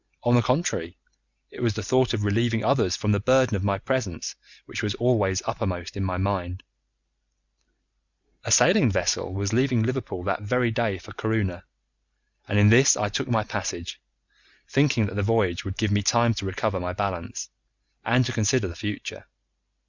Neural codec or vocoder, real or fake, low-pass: none; real; 7.2 kHz